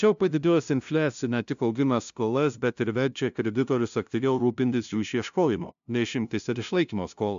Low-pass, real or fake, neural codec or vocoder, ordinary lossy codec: 7.2 kHz; fake; codec, 16 kHz, 0.5 kbps, FunCodec, trained on LibriTTS, 25 frames a second; AAC, 96 kbps